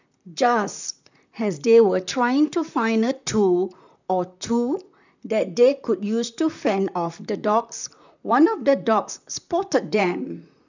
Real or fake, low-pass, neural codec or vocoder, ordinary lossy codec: fake; 7.2 kHz; vocoder, 44.1 kHz, 128 mel bands, Pupu-Vocoder; none